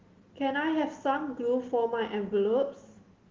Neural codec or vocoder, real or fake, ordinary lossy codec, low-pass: none; real; Opus, 16 kbps; 7.2 kHz